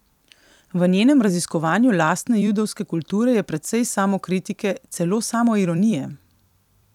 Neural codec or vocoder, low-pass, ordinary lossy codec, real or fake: vocoder, 44.1 kHz, 128 mel bands every 256 samples, BigVGAN v2; 19.8 kHz; none; fake